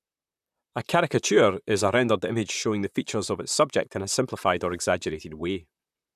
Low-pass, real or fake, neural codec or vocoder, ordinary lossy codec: 14.4 kHz; fake; vocoder, 44.1 kHz, 128 mel bands, Pupu-Vocoder; none